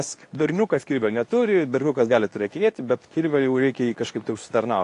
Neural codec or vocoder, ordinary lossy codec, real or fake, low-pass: codec, 24 kHz, 0.9 kbps, WavTokenizer, medium speech release version 2; AAC, 48 kbps; fake; 10.8 kHz